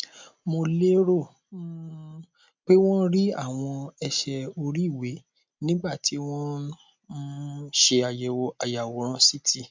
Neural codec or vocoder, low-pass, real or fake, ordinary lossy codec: none; 7.2 kHz; real; MP3, 64 kbps